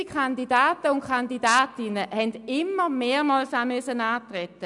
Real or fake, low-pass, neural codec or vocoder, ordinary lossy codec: real; 10.8 kHz; none; none